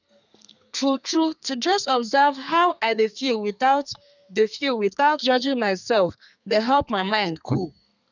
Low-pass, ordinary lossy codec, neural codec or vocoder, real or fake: 7.2 kHz; none; codec, 32 kHz, 1.9 kbps, SNAC; fake